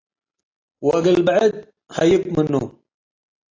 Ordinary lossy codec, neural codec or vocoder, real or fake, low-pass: AAC, 32 kbps; none; real; 7.2 kHz